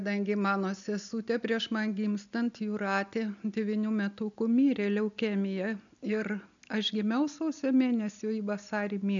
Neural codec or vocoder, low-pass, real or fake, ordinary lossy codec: none; 7.2 kHz; real; MP3, 96 kbps